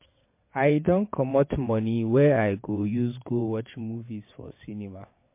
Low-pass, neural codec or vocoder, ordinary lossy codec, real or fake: 3.6 kHz; vocoder, 22.05 kHz, 80 mel bands, WaveNeXt; MP3, 24 kbps; fake